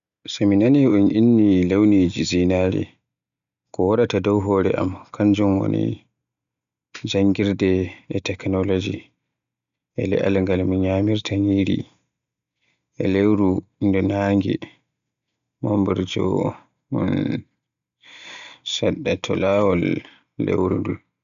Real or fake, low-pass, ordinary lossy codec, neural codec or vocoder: real; 7.2 kHz; none; none